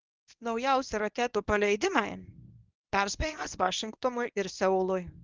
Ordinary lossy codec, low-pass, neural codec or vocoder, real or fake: Opus, 24 kbps; 7.2 kHz; codec, 24 kHz, 0.9 kbps, WavTokenizer, medium speech release version 1; fake